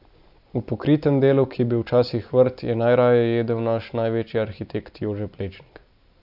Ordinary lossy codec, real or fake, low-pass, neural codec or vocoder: AAC, 48 kbps; real; 5.4 kHz; none